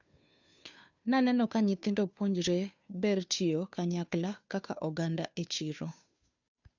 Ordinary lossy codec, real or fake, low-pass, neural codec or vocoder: none; fake; 7.2 kHz; codec, 16 kHz, 2 kbps, FunCodec, trained on Chinese and English, 25 frames a second